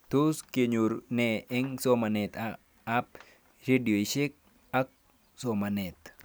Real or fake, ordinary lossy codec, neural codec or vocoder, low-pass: real; none; none; none